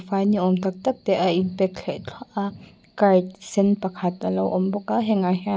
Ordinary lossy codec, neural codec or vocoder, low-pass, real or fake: none; none; none; real